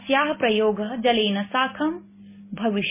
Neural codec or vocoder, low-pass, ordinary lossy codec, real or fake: none; 3.6 kHz; MP3, 16 kbps; real